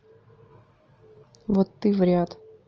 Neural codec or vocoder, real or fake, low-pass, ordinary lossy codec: none; real; 7.2 kHz; Opus, 24 kbps